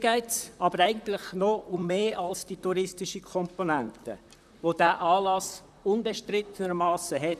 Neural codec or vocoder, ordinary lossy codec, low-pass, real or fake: vocoder, 44.1 kHz, 128 mel bands, Pupu-Vocoder; none; 14.4 kHz; fake